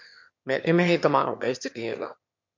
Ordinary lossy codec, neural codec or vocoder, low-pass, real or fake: MP3, 48 kbps; autoencoder, 22.05 kHz, a latent of 192 numbers a frame, VITS, trained on one speaker; 7.2 kHz; fake